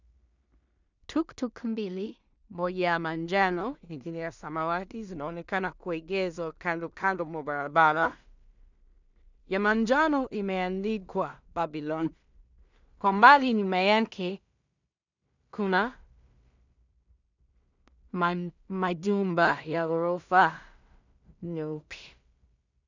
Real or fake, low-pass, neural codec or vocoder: fake; 7.2 kHz; codec, 16 kHz in and 24 kHz out, 0.4 kbps, LongCat-Audio-Codec, two codebook decoder